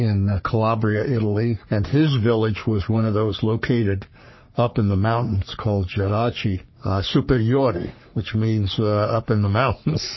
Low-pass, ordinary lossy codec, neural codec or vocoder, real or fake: 7.2 kHz; MP3, 24 kbps; codec, 44.1 kHz, 3.4 kbps, Pupu-Codec; fake